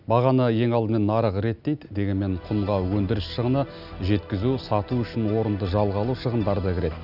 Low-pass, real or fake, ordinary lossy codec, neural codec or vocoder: 5.4 kHz; real; none; none